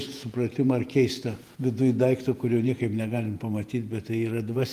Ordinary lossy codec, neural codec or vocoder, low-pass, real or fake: Opus, 32 kbps; vocoder, 48 kHz, 128 mel bands, Vocos; 14.4 kHz; fake